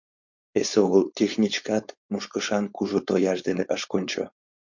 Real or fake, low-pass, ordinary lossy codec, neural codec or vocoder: fake; 7.2 kHz; MP3, 48 kbps; codec, 16 kHz, 4.8 kbps, FACodec